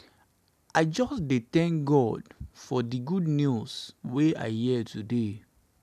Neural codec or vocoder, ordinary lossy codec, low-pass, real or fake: none; none; 14.4 kHz; real